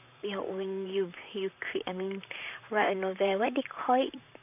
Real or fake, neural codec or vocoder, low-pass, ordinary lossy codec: fake; vocoder, 44.1 kHz, 128 mel bands, Pupu-Vocoder; 3.6 kHz; none